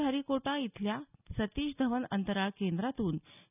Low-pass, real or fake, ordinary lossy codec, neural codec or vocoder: 3.6 kHz; real; none; none